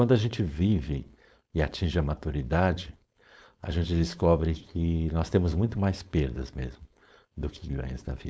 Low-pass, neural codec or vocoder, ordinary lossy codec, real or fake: none; codec, 16 kHz, 4.8 kbps, FACodec; none; fake